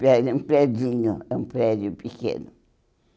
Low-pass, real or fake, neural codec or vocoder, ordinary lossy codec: none; real; none; none